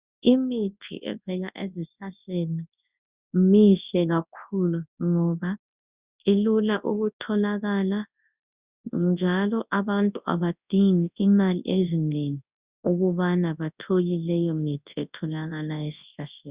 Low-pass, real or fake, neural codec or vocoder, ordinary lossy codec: 3.6 kHz; fake; codec, 24 kHz, 0.9 kbps, WavTokenizer, large speech release; Opus, 64 kbps